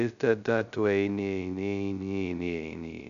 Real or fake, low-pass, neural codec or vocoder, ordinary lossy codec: fake; 7.2 kHz; codec, 16 kHz, 0.2 kbps, FocalCodec; MP3, 96 kbps